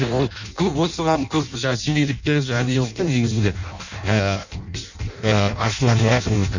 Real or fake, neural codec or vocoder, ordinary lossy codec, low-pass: fake; codec, 16 kHz in and 24 kHz out, 0.6 kbps, FireRedTTS-2 codec; none; 7.2 kHz